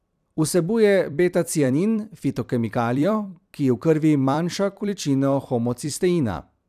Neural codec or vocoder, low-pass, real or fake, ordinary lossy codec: vocoder, 44.1 kHz, 128 mel bands every 256 samples, BigVGAN v2; 14.4 kHz; fake; none